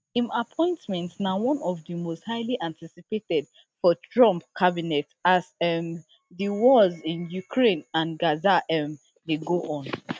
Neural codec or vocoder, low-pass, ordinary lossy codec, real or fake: none; none; none; real